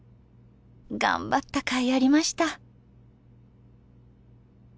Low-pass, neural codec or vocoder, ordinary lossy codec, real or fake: none; none; none; real